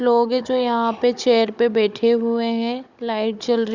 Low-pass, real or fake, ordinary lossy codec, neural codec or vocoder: 7.2 kHz; fake; Opus, 64 kbps; codec, 16 kHz, 16 kbps, FunCodec, trained on Chinese and English, 50 frames a second